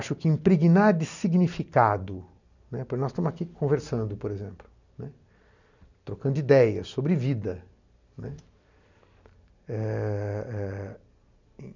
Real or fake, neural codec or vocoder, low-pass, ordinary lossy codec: real; none; 7.2 kHz; none